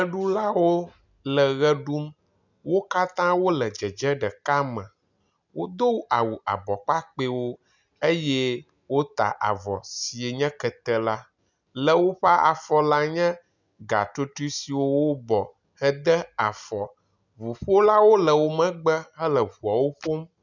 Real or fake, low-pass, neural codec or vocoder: real; 7.2 kHz; none